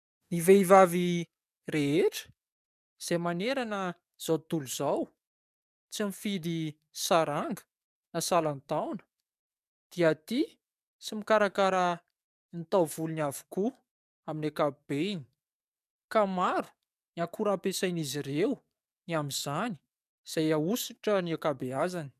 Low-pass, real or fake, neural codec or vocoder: 14.4 kHz; fake; codec, 44.1 kHz, 7.8 kbps, DAC